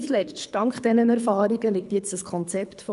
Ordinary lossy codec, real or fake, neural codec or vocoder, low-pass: none; fake; codec, 24 kHz, 3 kbps, HILCodec; 10.8 kHz